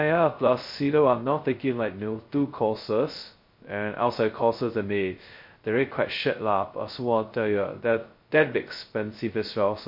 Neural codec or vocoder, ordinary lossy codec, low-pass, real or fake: codec, 16 kHz, 0.2 kbps, FocalCodec; MP3, 32 kbps; 5.4 kHz; fake